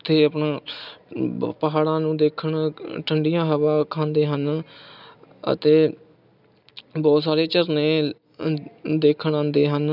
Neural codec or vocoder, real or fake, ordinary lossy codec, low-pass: none; real; none; 5.4 kHz